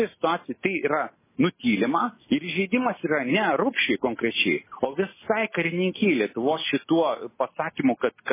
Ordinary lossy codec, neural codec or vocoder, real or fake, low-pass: MP3, 16 kbps; none; real; 3.6 kHz